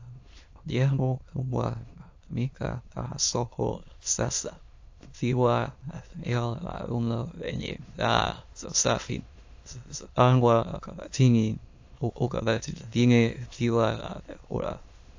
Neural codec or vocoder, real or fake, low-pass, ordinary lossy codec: autoencoder, 22.05 kHz, a latent of 192 numbers a frame, VITS, trained on many speakers; fake; 7.2 kHz; AAC, 48 kbps